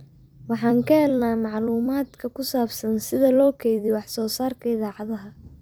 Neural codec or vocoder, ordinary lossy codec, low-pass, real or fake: vocoder, 44.1 kHz, 128 mel bands every 256 samples, BigVGAN v2; none; none; fake